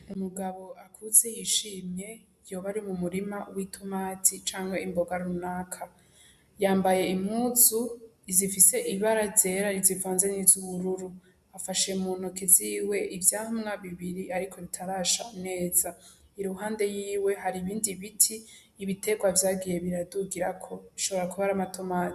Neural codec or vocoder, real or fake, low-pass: none; real; 14.4 kHz